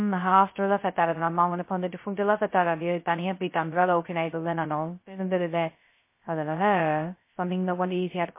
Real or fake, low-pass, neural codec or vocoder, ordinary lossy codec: fake; 3.6 kHz; codec, 16 kHz, 0.2 kbps, FocalCodec; MP3, 24 kbps